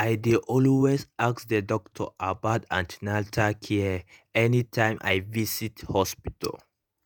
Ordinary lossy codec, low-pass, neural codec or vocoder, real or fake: none; none; vocoder, 48 kHz, 128 mel bands, Vocos; fake